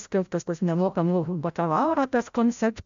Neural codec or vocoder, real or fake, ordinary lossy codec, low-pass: codec, 16 kHz, 0.5 kbps, FreqCodec, larger model; fake; MP3, 64 kbps; 7.2 kHz